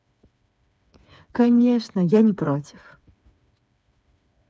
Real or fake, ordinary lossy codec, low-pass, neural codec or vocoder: fake; none; none; codec, 16 kHz, 4 kbps, FreqCodec, smaller model